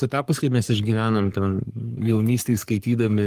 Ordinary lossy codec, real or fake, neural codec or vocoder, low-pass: Opus, 24 kbps; fake; codec, 44.1 kHz, 3.4 kbps, Pupu-Codec; 14.4 kHz